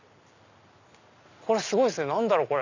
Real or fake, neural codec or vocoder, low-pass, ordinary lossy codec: real; none; 7.2 kHz; none